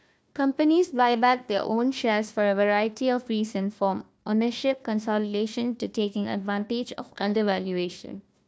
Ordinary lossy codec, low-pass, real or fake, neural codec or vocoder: none; none; fake; codec, 16 kHz, 1 kbps, FunCodec, trained on Chinese and English, 50 frames a second